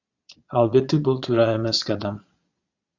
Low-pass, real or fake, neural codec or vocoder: 7.2 kHz; fake; vocoder, 22.05 kHz, 80 mel bands, Vocos